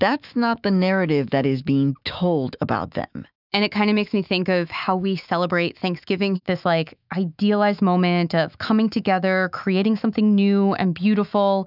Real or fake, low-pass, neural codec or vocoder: fake; 5.4 kHz; autoencoder, 48 kHz, 128 numbers a frame, DAC-VAE, trained on Japanese speech